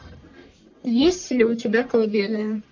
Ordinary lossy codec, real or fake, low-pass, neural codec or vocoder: MP3, 48 kbps; fake; 7.2 kHz; codec, 44.1 kHz, 1.7 kbps, Pupu-Codec